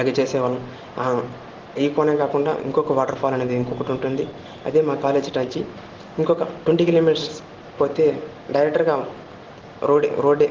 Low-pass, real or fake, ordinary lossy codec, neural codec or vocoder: 7.2 kHz; real; Opus, 16 kbps; none